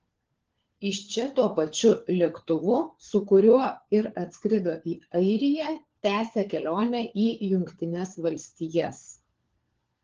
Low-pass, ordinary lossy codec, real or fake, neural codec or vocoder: 7.2 kHz; Opus, 16 kbps; fake; codec, 16 kHz, 4 kbps, FunCodec, trained on LibriTTS, 50 frames a second